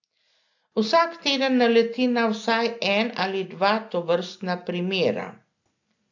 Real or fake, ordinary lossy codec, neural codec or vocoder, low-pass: real; AAC, 48 kbps; none; 7.2 kHz